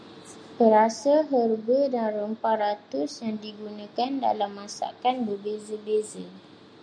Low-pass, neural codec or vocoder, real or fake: 9.9 kHz; none; real